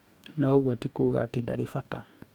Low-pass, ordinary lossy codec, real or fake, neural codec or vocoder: 19.8 kHz; none; fake; codec, 44.1 kHz, 2.6 kbps, DAC